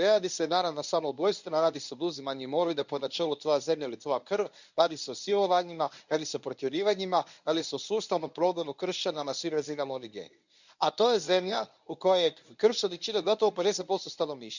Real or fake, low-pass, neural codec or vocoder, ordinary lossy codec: fake; 7.2 kHz; codec, 24 kHz, 0.9 kbps, WavTokenizer, medium speech release version 1; none